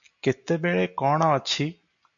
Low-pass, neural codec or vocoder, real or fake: 7.2 kHz; none; real